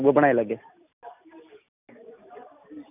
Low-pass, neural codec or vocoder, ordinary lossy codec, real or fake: 3.6 kHz; none; none; real